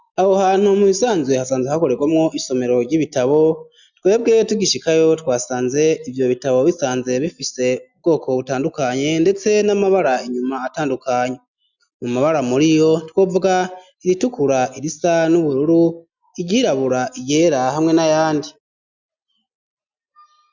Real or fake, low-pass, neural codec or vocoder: real; 7.2 kHz; none